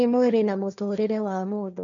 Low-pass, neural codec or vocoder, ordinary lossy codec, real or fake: 7.2 kHz; codec, 16 kHz, 1.1 kbps, Voila-Tokenizer; none; fake